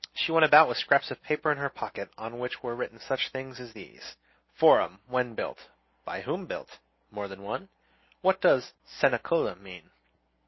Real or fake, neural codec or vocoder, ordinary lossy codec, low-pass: real; none; MP3, 24 kbps; 7.2 kHz